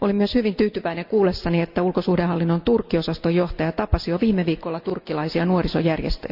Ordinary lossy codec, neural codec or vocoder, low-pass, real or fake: Opus, 64 kbps; none; 5.4 kHz; real